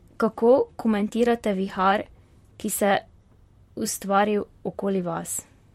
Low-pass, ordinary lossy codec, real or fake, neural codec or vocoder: 19.8 kHz; MP3, 64 kbps; fake; vocoder, 44.1 kHz, 128 mel bands, Pupu-Vocoder